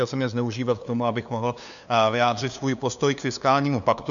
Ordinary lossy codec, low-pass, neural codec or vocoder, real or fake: MP3, 96 kbps; 7.2 kHz; codec, 16 kHz, 2 kbps, FunCodec, trained on LibriTTS, 25 frames a second; fake